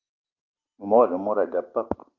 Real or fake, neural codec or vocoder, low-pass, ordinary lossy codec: real; none; 7.2 kHz; Opus, 24 kbps